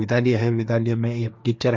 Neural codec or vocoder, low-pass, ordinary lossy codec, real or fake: codec, 16 kHz, 1.1 kbps, Voila-Tokenizer; none; none; fake